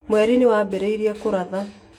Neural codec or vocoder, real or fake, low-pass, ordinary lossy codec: autoencoder, 48 kHz, 128 numbers a frame, DAC-VAE, trained on Japanese speech; fake; 19.8 kHz; MP3, 96 kbps